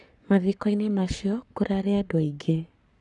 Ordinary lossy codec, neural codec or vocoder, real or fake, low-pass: none; codec, 44.1 kHz, 7.8 kbps, Pupu-Codec; fake; 10.8 kHz